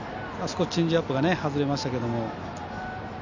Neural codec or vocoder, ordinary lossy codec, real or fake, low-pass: none; none; real; 7.2 kHz